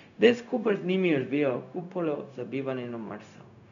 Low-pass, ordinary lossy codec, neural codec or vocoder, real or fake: 7.2 kHz; MP3, 96 kbps; codec, 16 kHz, 0.4 kbps, LongCat-Audio-Codec; fake